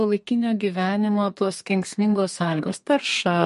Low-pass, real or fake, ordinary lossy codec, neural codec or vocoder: 14.4 kHz; fake; MP3, 48 kbps; codec, 32 kHz, 1.9 kbps, SNAC